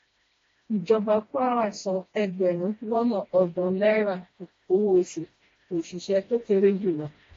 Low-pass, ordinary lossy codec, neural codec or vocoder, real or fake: 7.2 kHz; AAC, 32 kbps; codec, 16 kHz, 1 kbps, FreqCodec, smaller model; fake